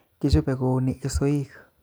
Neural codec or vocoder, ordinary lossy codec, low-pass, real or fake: none; none; none; real